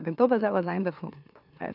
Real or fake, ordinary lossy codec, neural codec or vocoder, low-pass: fake; none; autoencoder, 44.1 kHz, a latent of 192 numbers a frame, MeloTTS; 5.4 kHz